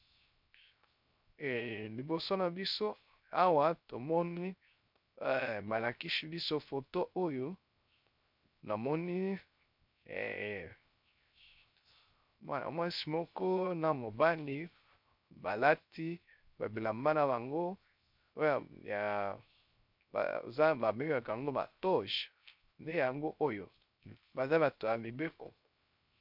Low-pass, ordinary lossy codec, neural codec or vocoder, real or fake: 5.4 kHz; MP3, 48 kbps; codec, 16 kHz, 0.3 kbps, FocalCodec; fake